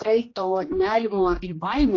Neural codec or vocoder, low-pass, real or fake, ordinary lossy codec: codec, 16 kHz, 1 kbps, X-Codec, HuBERT features, trained on general audio; 7.2 kHz; fake; AAC, 32 kbps